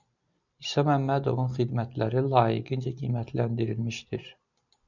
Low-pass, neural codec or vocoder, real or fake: 7.2 kHz; none; real